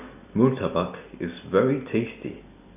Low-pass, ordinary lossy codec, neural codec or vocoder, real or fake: 3.6 kHz; none; none; real